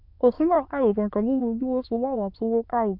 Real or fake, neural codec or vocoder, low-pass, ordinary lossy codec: fake; autoencoder, 22.05 kHz, a latent of 192 numbers a frame, VITS, trained on many speakers; 5.4 kHz; Opus, 64 kbps